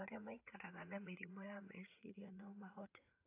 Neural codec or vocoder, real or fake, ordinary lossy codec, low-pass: vocoder, 44.1 kHz, 80 mel bands, Vocos; fake; AAC, 24 kbps; 3.6 kHz